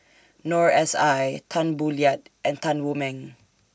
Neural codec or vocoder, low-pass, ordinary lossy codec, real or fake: none; none; none; real